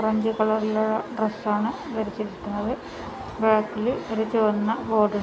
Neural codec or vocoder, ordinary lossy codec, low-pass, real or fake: none; none; none; real